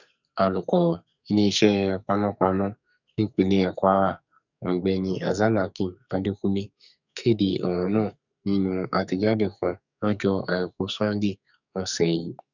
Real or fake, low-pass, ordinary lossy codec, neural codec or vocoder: fake; 7.2 kHz; none; codec, 44.1 kHz, 2.6 kbps, SNAC